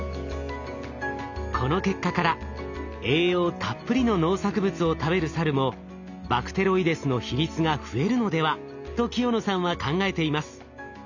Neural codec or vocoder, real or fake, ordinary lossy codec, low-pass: none; real; none; 7.2 kHz